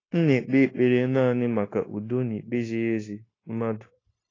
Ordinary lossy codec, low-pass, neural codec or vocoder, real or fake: AAC, 32 kbps; 7.2 kHz; codec, 16 kHz, 0.9 kbps, LongCat-Audio-Codec; fake